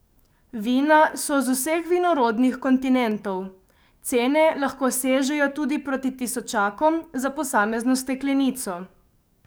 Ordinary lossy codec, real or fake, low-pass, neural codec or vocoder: none; fake; none; codec, 44.1 kHz, 7.8 kbps, DAC